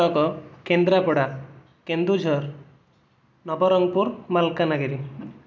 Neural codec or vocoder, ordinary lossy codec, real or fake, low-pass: none; none; real; none